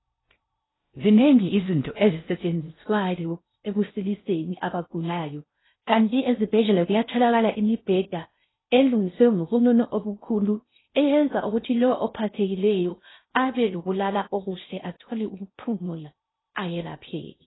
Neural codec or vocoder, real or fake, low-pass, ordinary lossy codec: codec, 16 kHz in and 24 kHz out, 0.6 kbps, FocalCodec, streaming, 4096 codes; fake; 7.2 kHz; AAC, 16 kbps